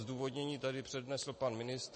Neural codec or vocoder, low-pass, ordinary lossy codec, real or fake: none; 9.9 kHz; MP3, 32 kbps; real